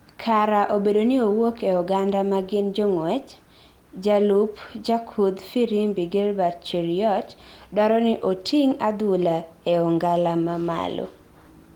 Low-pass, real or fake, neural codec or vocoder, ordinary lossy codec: 19.8 kHz; real; none; Opus, 24 kbps